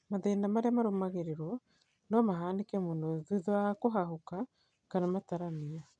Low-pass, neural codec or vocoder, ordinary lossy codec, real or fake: 9.9 kHz; none; none; real